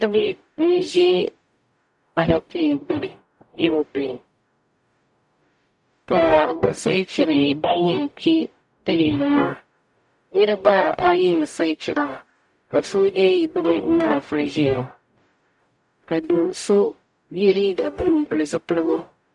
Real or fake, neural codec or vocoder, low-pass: fake; codec, 44.1 kHz, 0.9 kbps, DAC; 10.8 kHz